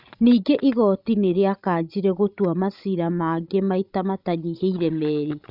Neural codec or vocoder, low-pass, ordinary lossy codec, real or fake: codec, 16 kHz, 16 kbps, FreqCodec, larger model; 5.4 kHz; none; fake